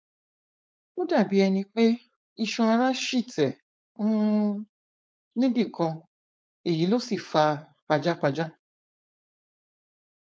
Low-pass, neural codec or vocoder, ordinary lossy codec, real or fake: none; codec, 16 kHz, 4.8 kbps, FACodec; none; fake